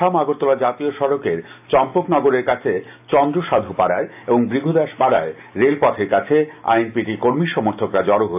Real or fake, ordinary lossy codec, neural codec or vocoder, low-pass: real; AAC, 32 kbps; none; 3.6 kHz